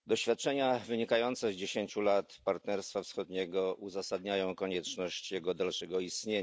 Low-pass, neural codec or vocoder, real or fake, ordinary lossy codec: none; none; real; none